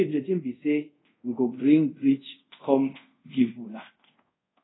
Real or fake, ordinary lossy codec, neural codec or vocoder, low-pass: fake; AAC, 16 kbps; codec, 24 kHz, 0.5 kbps, DualCodec; 7.2 kHz